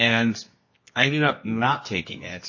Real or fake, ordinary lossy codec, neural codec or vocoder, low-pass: fake; MP3, 32 kbps; codec, 24 kHz, 0.9 kbps, WavTokenizer, medium music audio release; 7.2 kHz